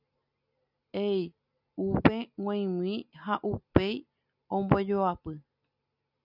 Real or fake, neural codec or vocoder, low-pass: real; none; 5.4 kHz